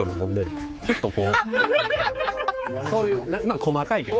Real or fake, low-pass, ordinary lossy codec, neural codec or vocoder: fake; none; none; codec, 16 kHz, 4 kbps, X-Codec, HuBERT features, trained on general audio